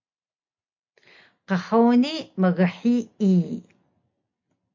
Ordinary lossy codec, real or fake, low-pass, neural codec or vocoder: MP3, 48 kbps; real; 7.2 kHz; none